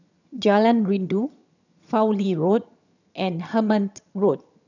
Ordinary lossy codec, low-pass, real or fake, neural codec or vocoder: none; 7.2 kHz; fake; vocoder, 22.05 kHz, 80 mel bands, HiFi-GAN